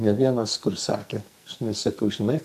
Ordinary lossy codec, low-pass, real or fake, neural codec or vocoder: AAC, 96 kbps; 14.4 kHz; fake; codec, 44.1 kHz, 2.6 kbps, SNAC